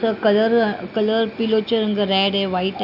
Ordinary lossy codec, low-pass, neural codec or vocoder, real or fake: none; 5.4 kHz; none; real